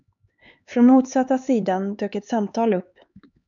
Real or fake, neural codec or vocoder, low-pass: fake; codec, 16 kHz, 4 kbps, X-Codec, HuBERT features, trained on LibriSpeech; 7.2 kHz